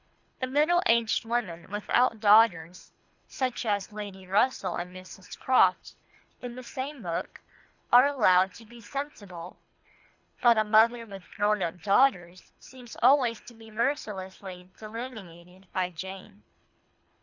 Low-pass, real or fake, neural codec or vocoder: 7.2 kHz; fake; codec, 24 kHz, 3 kbps, HILCodec